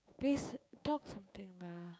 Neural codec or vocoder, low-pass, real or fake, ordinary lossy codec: codec, 16 kHz, 6 kbps, DAC; none; fake; none